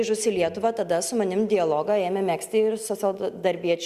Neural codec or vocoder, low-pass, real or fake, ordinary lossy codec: none; 14.4 kHz; real; Opus, 64 kbps